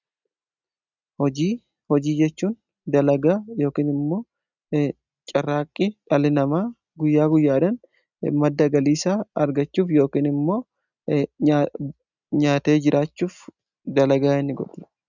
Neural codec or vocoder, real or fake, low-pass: none; real; 7.2 kHz